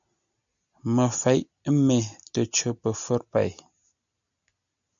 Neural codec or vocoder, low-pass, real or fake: none; 7.2 kHz; real